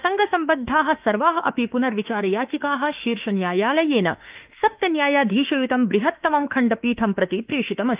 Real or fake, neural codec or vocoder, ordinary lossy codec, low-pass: fake; autoencoder, 48 kHz, 32 numbers a frame, DAC-VAE, trained on Japanese speech; Opus, 24 kbps; 3.6 kHz